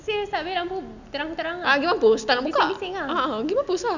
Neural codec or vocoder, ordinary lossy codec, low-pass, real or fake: none; none; 7.2 kHz; real